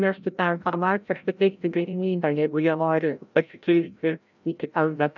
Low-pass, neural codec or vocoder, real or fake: 7.2 kHz; codec, 16 kHz, 0.5 kbps, FreqCodec, larger model; fake